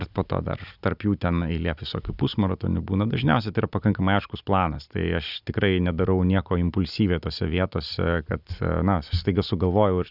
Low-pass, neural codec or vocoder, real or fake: 5.4 kHz; none; real